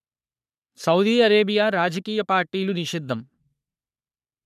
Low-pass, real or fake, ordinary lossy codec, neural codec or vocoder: 14.4 kHz; fake; none; codec, 44.1 kHz, 3.4 kbps, Pupu-Codec